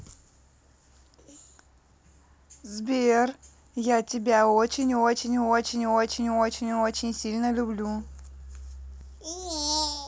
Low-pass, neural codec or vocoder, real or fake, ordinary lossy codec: none; none; real; none